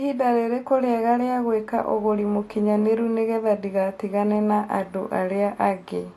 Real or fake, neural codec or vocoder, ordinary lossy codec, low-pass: real; none; AAC, 48 kbps; 14.4 kHz